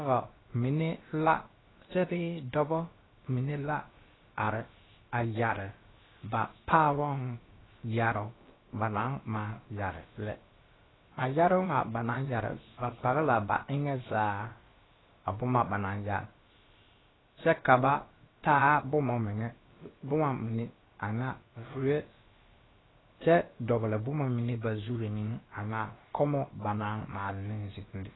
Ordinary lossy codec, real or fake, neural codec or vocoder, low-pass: AAC, 16 kbps; fake; codec, 16 kHz, about 1 kbps, DyCAST, with the encoder's durations; 7.2 kHz